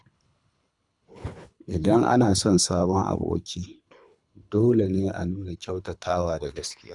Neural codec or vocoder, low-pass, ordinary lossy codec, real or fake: codec, 24 kHz, 3 kbps, HILCodec; 10.8 kHz; none; fake